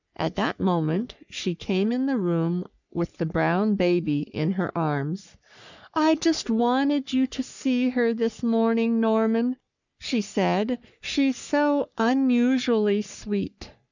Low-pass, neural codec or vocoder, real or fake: 7.2 kHz; codec, 44.1 kHz, 3.4 kbps, Pupu-Codec; fake